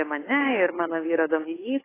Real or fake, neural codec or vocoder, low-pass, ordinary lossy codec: fake; codec, 16 kHz, 8 kbps, FunCodec, trained on Chinese and English, 25 frames a second; 3.6 kHz; AAC, 16 kbps